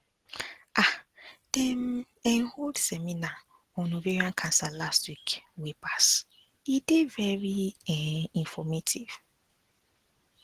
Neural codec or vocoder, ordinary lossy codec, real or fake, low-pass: none; Opus, 16 kbps; real; 14.4 kHz